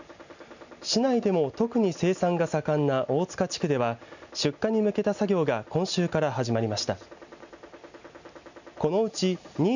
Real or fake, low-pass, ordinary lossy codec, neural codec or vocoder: real; 7.2 kHz; none; none